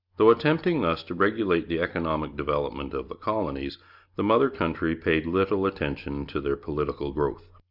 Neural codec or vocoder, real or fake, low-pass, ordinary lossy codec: none; real; 5.4 kHz; Opus, 64 kbps